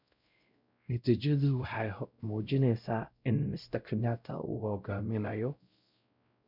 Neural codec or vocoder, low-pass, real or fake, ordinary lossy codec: codec, 16 kHz, 0.5 kbps, X-Codec, WavLM features, trained on Multilingual LibriSpeech; 5.4 kHz; fake; none